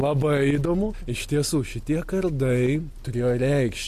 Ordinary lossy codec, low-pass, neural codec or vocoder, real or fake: MP3, 64 kbps; 14.4 kHz; none; real